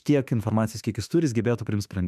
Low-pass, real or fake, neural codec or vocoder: 14.4 kHz; fake; autoencoder, 48 kHz, 32 numbers a frame, DAC-VAE, trained on Japanese speech